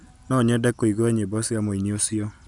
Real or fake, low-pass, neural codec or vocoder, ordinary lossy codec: real; 10.8 kHz; none; none